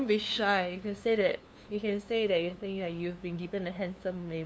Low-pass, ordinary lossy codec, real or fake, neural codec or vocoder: none; none; fake; codec, 16 kHz, 2 kbps, FunCodec, trained on LibriTTS, 25 frames a second